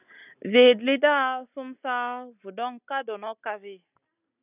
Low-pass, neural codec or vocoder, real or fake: 3.6 kHz; none; real